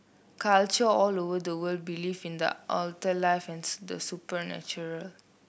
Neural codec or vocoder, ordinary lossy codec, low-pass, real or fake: none; none; none; real